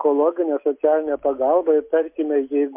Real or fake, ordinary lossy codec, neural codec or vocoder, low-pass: real; MP3, 32 kbps; none; 3.6 kHz